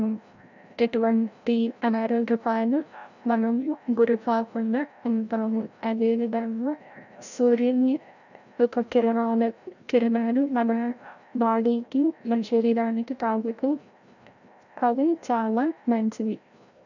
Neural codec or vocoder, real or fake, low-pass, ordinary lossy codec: codec, 16 kHz, 0.5 kbps, FreqCodec, larger model; fake; 7.2 kHz; none